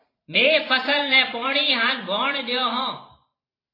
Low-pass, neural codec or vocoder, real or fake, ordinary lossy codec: 5.4 kHz; vocoder, 44.1 kHz, 128 mel bands every 512 samples, BigVGAN v2; fake; AAC, 24 kbps